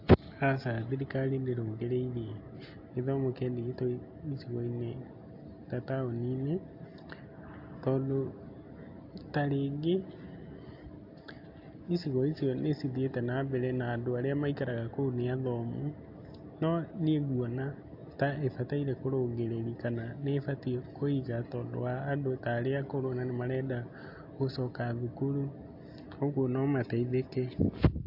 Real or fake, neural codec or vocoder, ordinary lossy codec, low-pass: real; none; AAC, 48 kbps; 5.4 kHz